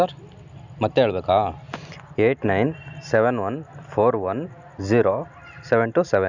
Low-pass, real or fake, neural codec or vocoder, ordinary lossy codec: 7.2 kHz; real; none; none